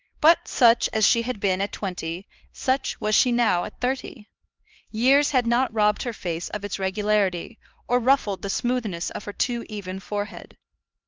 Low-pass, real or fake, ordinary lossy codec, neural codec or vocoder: 7.2 kHz; fake; Opus, 24 kbps; codec, 16 kHz, 2 kbps, X-Codec, HuBERT features, trained on LibriSpeech